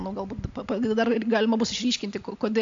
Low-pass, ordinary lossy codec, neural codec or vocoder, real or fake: 7.2 kHz; AAC, 64 kbps; none; real